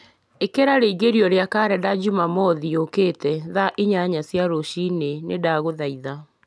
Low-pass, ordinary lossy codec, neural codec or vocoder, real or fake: none; none; none; real